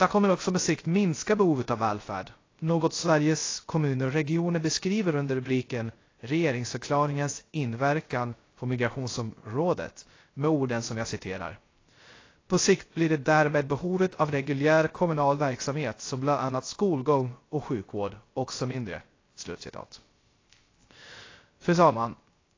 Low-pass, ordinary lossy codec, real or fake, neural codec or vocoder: 7.2 kHz; AAC, 32 kbps; fake; codec, 16 kHz, 0.3 kbps, FocalCodec